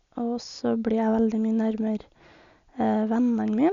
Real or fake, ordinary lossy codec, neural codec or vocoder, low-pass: real; Opus, 64 kbps; none; 7.2 kHz